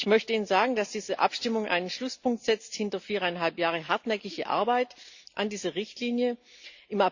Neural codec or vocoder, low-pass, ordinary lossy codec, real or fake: none; 7.2 kHz; Opus, 64 kbps; real